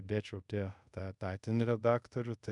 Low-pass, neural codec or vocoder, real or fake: 10.8 kHz; codec, 24 kHz, 0.5 kbps, DualCodec; fake